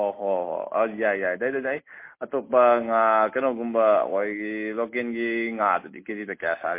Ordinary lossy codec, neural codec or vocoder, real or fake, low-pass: MP3, 24 kbps; none; real; 3.6 kHz